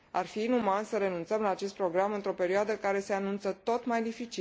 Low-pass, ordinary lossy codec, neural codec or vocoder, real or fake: none; none; none; real